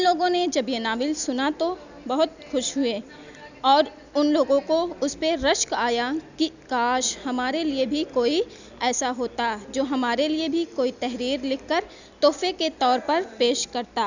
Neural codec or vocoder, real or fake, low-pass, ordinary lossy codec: none; real; 7.2 kHz; none